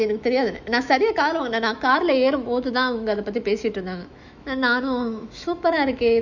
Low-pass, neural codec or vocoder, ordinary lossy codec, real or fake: 7.2 kHz; autoencoder, 48 kHz, 128 numbers a frame, DAC-VAE, trained on Japanese speech; none; fake